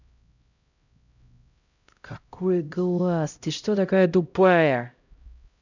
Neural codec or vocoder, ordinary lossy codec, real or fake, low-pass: codec, 16 kHz, 0.5 kbps, X-Codec, HuBERT features, trained on LibriSpeech; none; fake; 7.2 kHz